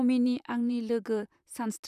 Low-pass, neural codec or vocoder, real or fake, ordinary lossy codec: 14.4 kHz; vocoder, 44.1 kHz, 128 mel bands, Pupu-Vocoder; fake; none